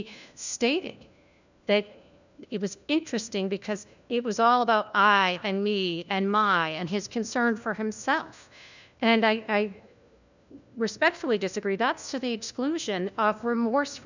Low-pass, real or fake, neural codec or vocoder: 7.2 kHz; fake; codec, 16 kHz, 1 kbps, FunCodec, trained on LibriTTS, 50 frames a second